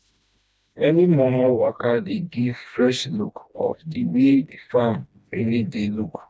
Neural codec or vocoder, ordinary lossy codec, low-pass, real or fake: codec, 16 kHz, 1 kbps, FreqCodec, smaller model; none; none; fake